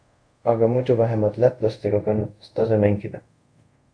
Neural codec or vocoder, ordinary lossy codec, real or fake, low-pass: codec, 24 kHz, 0.5 kbps, DualCodec; Opus, 64 kbps; fake; 9.9 kHz